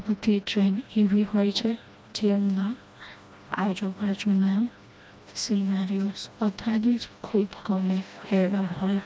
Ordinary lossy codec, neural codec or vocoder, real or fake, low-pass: none; codec, 16 kHz, 1 kbps, FreqCodec, smaller model; fake; none